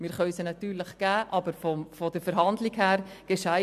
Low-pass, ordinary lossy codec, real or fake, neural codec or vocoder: 14.4 kHz; MP3, 96 kbps; real; none